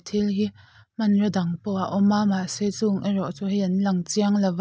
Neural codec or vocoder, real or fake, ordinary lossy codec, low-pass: none; real; none; none